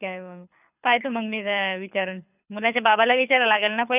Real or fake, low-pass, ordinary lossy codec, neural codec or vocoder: fake; 3.6 kHz; none; codec, 24 kHz, 6 kbps, HILCodec